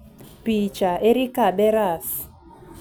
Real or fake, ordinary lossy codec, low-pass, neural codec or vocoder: real; none; none; none